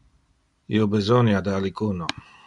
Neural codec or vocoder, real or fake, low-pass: none; real; 10.8 kHz